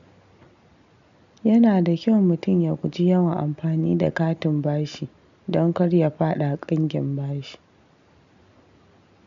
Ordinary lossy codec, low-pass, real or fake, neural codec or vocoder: MP3, 64 kbps; 7.2 kHz; real; none